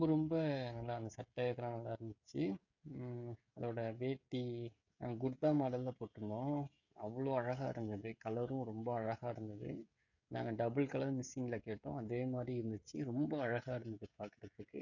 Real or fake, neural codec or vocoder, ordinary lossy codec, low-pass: fake; codec, 16 kHz, 16 kbps, FreqCodec, smaller model; none; 7.2 kHz